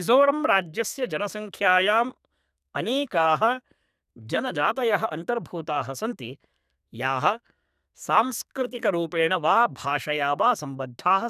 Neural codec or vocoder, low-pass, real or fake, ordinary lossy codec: codec, 32 kHz, 1.9 kbps, SNAC; 14.4 kHz; fake; none